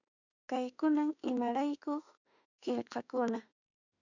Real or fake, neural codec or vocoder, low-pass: fake; autoencoder, 48 kHz, 32 numbers a frame, DAC-VAE, trained on Japanese speech; 7.2 kHz